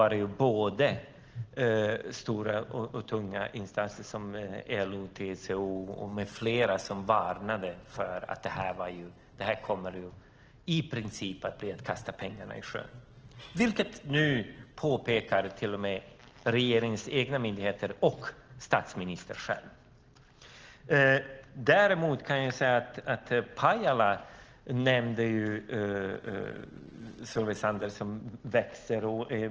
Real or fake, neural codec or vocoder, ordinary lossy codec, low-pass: real; none; Opus, 16 kbps; 7.2 kHz